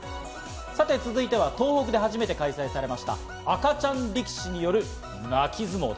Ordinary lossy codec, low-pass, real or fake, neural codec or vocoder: none; none; real; none